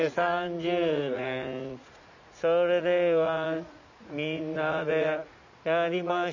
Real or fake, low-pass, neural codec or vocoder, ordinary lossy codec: fake; 7.2 kHz; vocoder, 44.1 kHz, 80 mel bands, Vocos; none